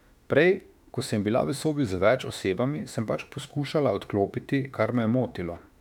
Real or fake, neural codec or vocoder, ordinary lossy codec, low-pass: fake; autoencoder, 48 kHz, 32 numbers a frame, DAC-VAE, trained on Japanese speech; none; 19.8 kHz